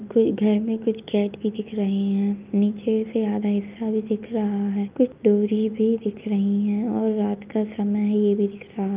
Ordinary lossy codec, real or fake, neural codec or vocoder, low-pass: Opus, 24 kbps; real; none; 3.6 kHz